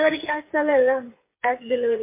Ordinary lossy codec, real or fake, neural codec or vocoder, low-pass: MP3, 24 kbps; fake; codec, 16 kHz, 8 kbps, FreqCodec, smaller model; 3.6 kHz